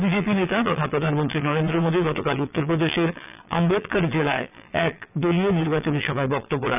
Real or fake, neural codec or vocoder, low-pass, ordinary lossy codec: fake; vocoder, 22.05 kHz, 80 mel bands, Vocos; 3.6 kHz; none